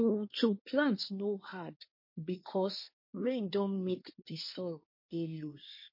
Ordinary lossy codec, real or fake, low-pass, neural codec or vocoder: MP3, 32 kbps; fake; 5.4 kHz; codec, 24 kHz, 1 kbps, SNAC